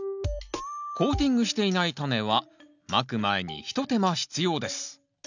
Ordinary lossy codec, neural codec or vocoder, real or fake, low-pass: none; none; real; 7.2 kHz